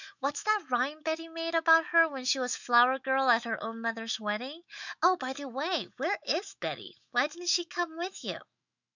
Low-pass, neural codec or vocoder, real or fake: 7.2 kHz; autoencoder, 48 kHz, 128 numbers a frame, DAC-VAE, trained on Japanese speech; fake